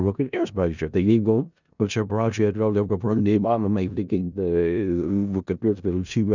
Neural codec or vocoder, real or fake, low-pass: codec, 16 kHz in and 24 kHz out, 0.4 kbps, LongCat-Audio-Codec, four codebook decoder; fake; 7.2 kHz